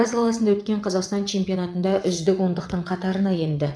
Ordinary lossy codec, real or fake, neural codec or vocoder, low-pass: none; fake; vocoder, 22.05 kHz, 80 mel bands, WaveNeXt; none